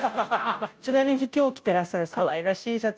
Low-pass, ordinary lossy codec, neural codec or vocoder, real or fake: none; none; codec, 16 kHz, 0.5 kbps, FunCodec, trained on Chinese and English, 25 frames a second; fake